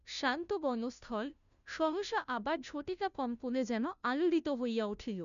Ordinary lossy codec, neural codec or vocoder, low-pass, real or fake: none; codec, 16 kHz, 0.5 kbps, FunCodec, trained on Chinese and English, 25 frames a second; 7.2 kHz; fake